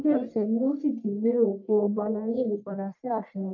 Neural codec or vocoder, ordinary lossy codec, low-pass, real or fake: codec, 44.1 kHz, 1.7 kbps, Pupu-Codec; none; 7.2 kHz; fake